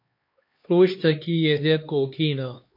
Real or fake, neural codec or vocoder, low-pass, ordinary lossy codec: fake; codec, 16 kHz, 2 kbps, X-Codec, HuBERT features, trained on LibriSpeech; 5.4 kHz; MP3, 32 kbps